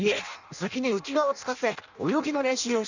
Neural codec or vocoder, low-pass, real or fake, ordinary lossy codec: codec, 16 kHz in and 24 kHz out, 0.6 kbps, FireRedTTS-2 codec; 7.2 kHz; fake; none